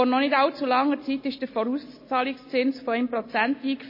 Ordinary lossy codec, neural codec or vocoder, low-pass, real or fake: MP3, 24 kbps; none; 5.4 kHz; real